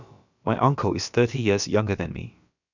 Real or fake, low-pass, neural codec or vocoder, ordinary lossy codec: fake; 7.2 kHz; codec, 16 kHz, about 1 kbps, DyCAST, with the encoder's durations; none